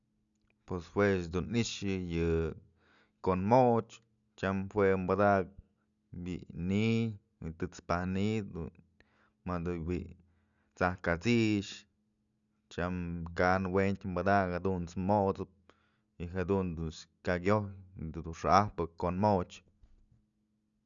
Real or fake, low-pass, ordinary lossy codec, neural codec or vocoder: real; 7.2 kHz; none; none